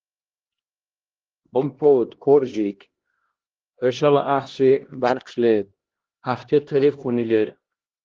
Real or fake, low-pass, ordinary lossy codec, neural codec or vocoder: fake; 7.2 kHz; Opus, 16 kbps; codec, 16 kHz, 1 kbps, X-Codec, HuBERT features, trained on LibriSpeech